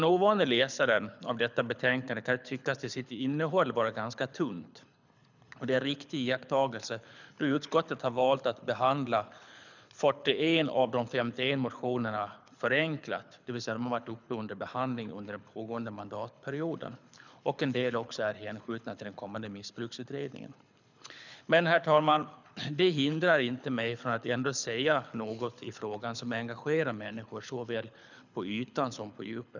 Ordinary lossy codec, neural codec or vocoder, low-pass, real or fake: none; codec, 24 kHz, 6 kbps, HILCodec; 7.2 kHz; fake